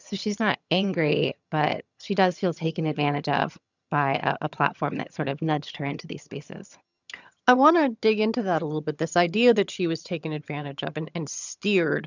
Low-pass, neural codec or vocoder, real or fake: 7.2 kHz; vocoder, 22.05 kHz, 80 mel bands, HiFi-GAN; fake